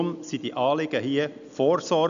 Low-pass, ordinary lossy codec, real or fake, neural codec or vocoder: 7.2 kHz; none; real; none